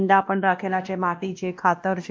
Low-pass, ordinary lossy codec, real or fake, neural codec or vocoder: none; none; fake; codec, 16 kHz, 1 kbps, X-Codec, WavLM features, trained on Multilingual LibriSpeech